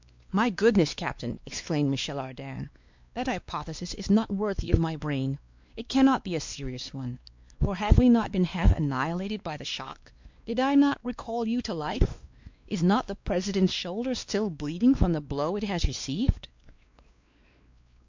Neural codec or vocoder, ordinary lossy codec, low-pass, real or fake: codec, 16 kHz, 2 kbps, X-Codec, WavLM features, trained on Multilingual LibriSpeech; MP3, 64 kbps; 7.2 kHz; fake